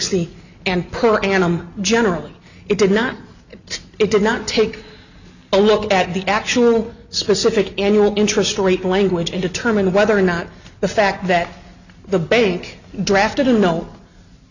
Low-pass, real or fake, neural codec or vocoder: 7.2 kHz; real; none